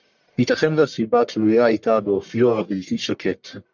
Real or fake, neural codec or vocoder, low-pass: fake; codec, 44.1 kHz, 1.7 kbps, Pupu-Codec; 7.2 kHz